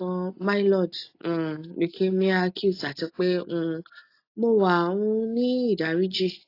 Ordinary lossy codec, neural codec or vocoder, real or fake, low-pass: AAC, 32 kbps; none; real; 5.4 kHz